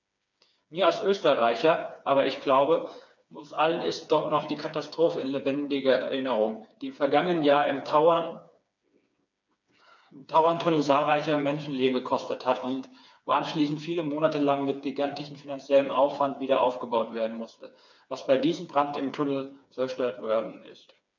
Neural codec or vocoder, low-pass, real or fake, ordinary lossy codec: codec, 16 kHz, 4 kbps, FreqCodec, smaller model; 7.2 kHz; fake; none